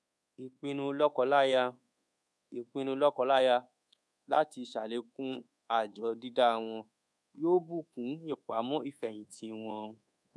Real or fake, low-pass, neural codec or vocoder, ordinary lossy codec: fake; none; codec, 24 kHz, 1.2 kbps, DualCodec; none